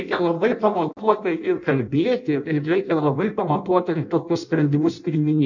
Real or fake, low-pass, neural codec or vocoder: fake; 7.2 kHz; codec, 16 kHz in and 24 kHz out, 0.6 kbps, FireRedTTS-2 codec